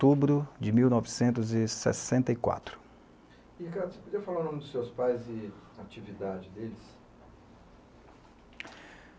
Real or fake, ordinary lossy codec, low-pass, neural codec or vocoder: real; none; none; none